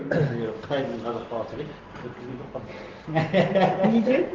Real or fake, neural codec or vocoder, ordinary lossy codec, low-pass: real; none; Opus, 16 kbps; 7.2 kHz